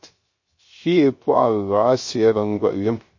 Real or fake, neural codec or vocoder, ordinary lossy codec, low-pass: fake; codec, 16 kHz, 0.3 kbps, FocalCodec; MP3, 32 kbps; 7.2 kHz